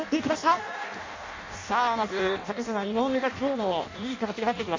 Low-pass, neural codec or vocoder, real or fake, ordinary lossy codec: 7.2 kHz; codec, 16 kHz in and 24 kHz out, 0.6 kbps, FireRedTTS-2 codec; fake; MP3, 48 kbps